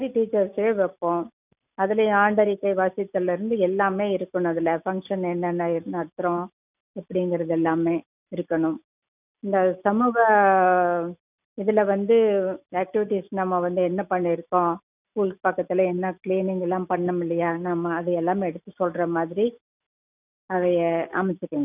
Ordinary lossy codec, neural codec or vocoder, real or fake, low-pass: none; none; real; 3.6 kHz